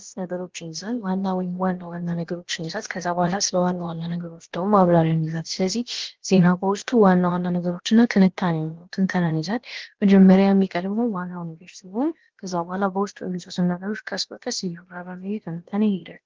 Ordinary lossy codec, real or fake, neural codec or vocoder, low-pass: Opus, 16 kbps; fake; codec, 16 kHz, about 1 kbps, DyCAST, with the encoder's durations; 7.2 kHz